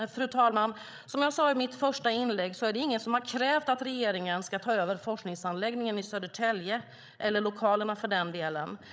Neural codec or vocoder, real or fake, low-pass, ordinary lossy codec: codec, 16 kHz, 16 kbps, FreqCodec, larger model; fake; none; none